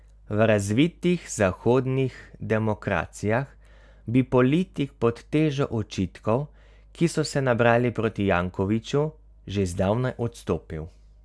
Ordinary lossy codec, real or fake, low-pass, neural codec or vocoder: none; real; none; none